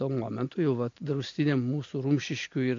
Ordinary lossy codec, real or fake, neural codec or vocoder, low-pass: AAC, 48 kbps; real; none; 7.2 kHz